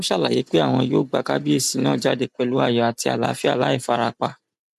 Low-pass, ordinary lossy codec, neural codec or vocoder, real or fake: 14.4 kHz; MP3, 96 kbps; none; real